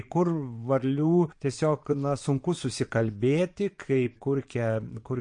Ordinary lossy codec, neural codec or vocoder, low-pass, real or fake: MP3, 48 kbps; vocoder, 22.05 kHz, 80 mel bands, Vocos; 9.9 kHz; fake